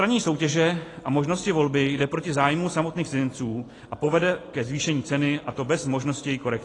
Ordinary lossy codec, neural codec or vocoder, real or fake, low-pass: AAC, 32 kbps; none; real; 10.8 kHz